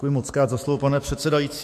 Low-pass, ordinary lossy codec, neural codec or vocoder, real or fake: 14.4 kHz; MP3, 64 kbps; autoencoder, 48 kHz, 128 numbers a frame, DAC-VAE, trained on Japanese speech; fake